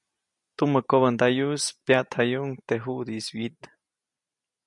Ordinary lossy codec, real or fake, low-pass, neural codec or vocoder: MP3, 96 kbps; real; 10.8 kHz; none